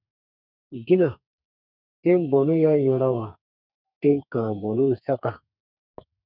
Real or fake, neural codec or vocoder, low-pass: fake; codec, 32 kHz, 1.9 kbps, SNAC; 5.4 kHz